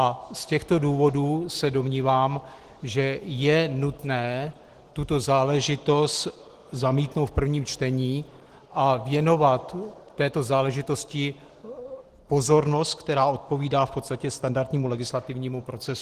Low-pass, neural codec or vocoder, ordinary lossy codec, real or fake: 14.4 kHz; none; Opus, 16 kbps; real